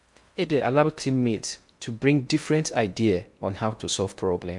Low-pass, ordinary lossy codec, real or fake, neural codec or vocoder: 10.8 kHz; MP3, 64 kbps; fake; codec, 16 kHz in and 24 kHz out, 0.6 kbps, FocalCodec, streaming, 2048 codes